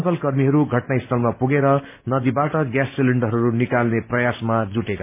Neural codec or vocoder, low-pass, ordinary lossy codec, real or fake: none; 3.6 kHz; MP3, 24 kbps; real